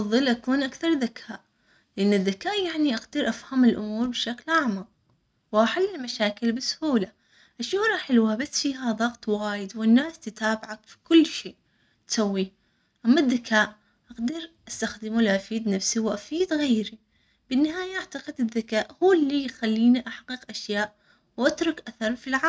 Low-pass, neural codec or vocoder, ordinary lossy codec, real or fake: none; none; none; real